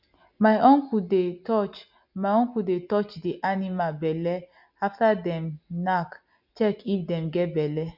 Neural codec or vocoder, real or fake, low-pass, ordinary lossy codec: none; real; 5.4 kHz; none